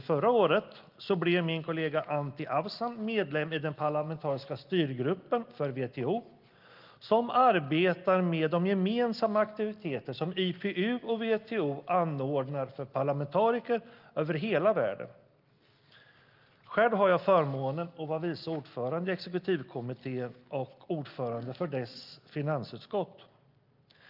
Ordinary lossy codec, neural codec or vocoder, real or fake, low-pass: Opus, 24 kbps; none; real; 5.4 kHz